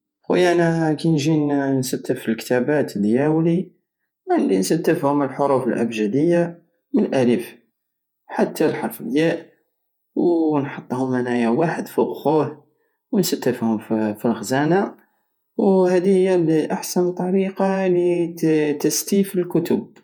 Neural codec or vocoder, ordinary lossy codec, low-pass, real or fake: vocoder, 48 kHz, 128 mel bands, Vocos; none; 19.8 kHz; fake